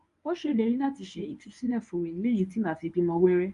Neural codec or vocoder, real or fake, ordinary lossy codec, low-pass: codec, 24 kHz, 0.9 kbps, WavTokenizer, medium speech release version 1; fake; none; 10.8 kHz